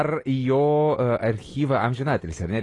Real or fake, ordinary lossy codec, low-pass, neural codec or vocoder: real; AAC, 32 kbps; 10.8 kHz; none